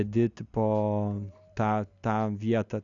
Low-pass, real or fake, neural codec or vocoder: 7.2 kHz; real; none